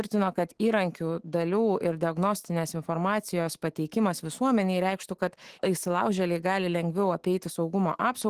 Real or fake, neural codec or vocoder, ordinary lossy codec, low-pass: fake; autoencoder, 48 kHz, 128 numbers a frame, DAC-VAE, trained on Japanese speech; Opus, 16 kbps; 14.4 kHz